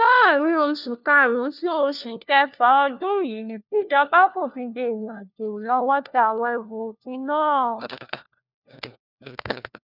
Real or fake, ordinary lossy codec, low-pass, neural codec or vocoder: fake; none; 5.4 kHz; codec, 16 kHz, 1 kbps, FunCodec, trained on LibriTTS, 50 frames a second